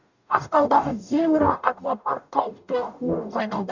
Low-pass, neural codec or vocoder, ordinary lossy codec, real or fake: 7.2 kHz; codec, 44.1 kHz, 0.9 kbps, DAC; none; fake